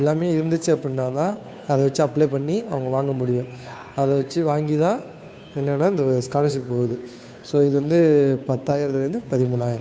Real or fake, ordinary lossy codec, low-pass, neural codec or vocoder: fake; none; none; codec, 16 kHz, 2 kbps, FunCodec, trained on Chinese and English, 25 frames a second